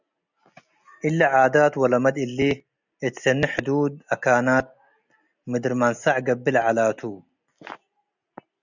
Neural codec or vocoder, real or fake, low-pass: none; real; 7.2 kHz